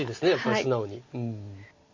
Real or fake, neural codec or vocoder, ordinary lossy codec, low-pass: real; none; MP3, 32 kbps; 7.2 kHz